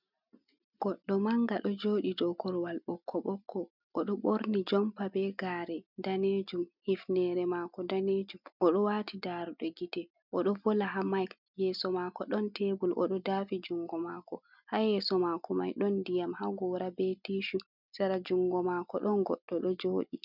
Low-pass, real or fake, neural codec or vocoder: 5.4 kHz; real; none